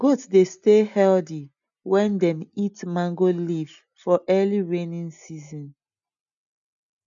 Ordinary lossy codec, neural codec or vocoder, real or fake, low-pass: none; none; real; 7.2 kHz